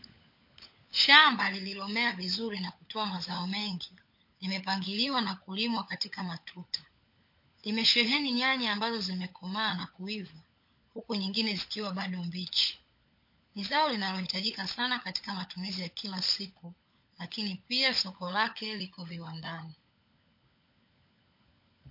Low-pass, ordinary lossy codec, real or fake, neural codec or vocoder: 5.4 kHz; MP3, 32 kbps; fake; codec, 16 kHz, 16 kbps, FunCodec, trained on LibriTTS, 50 frames a second